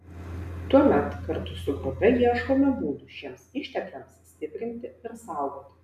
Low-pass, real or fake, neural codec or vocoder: 14.4 kHz; real; none